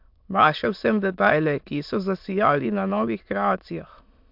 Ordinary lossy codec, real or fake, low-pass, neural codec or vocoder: MP3, 48 kbps; fake; 5.4 kHz; autoencoder, 22.05 kHz, a latent of 192 numbers a frame, VITS, trained on many speakers